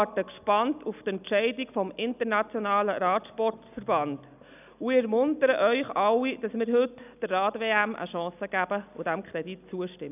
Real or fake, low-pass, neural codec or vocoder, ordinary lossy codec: real; 3.6 kHz; none; none